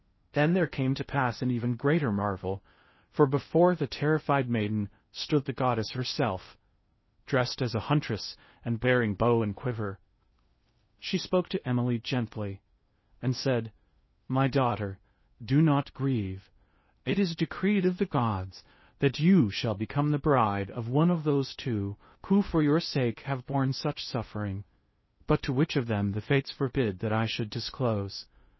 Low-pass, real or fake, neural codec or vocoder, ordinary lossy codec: 7.2 kHz; fake; codec, 16 kHz in and 24 kHz out, 0.6 kbps, FocalCodec, streaming, 4096 codes; MP3, 24 kbps